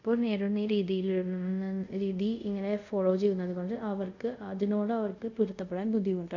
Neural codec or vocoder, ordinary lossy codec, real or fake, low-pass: codec, 24 kHz, 0.5 kbps, DualCodec; none; fake; 7.2 kHz